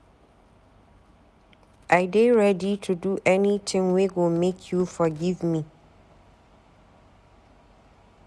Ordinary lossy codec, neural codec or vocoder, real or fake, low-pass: none; none; real; none